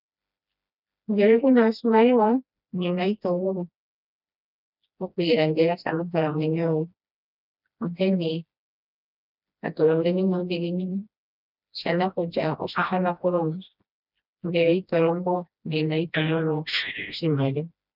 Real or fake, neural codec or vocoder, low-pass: fake; codec, 16 kHz, 1 kbps, FreqCodec, smaller model; 5.4 kHz